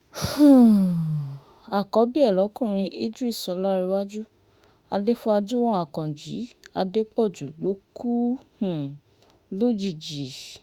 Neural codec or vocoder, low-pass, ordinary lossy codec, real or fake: autoencoder, 48 kHz, 32 numbers a frame, DAC-VAE, trained on Japanese speech; 19.8 kHz; Opus, 64 kbps; fake